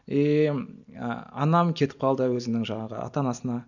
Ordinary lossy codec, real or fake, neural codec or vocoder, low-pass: none; real; none; 7.2 kHz